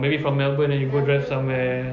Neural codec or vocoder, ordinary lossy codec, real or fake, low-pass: none; none; real; 7.2 kHz